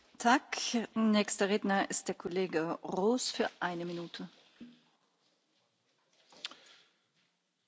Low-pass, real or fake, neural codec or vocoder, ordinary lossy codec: none; real; none; none